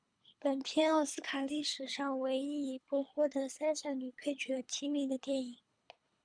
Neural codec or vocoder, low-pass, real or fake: codec, 24 kHz, 3 kbps, HILCodec; 9.9 kHz; fake